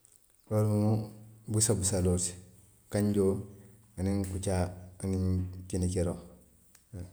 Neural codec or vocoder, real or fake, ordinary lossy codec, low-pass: none; real; none; none